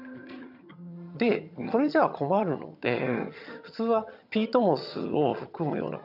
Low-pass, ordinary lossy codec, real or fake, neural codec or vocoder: 5.4 kHz; none; fake; vocoder, 22.05 kHz, 80 mel bands, HiFi-GAN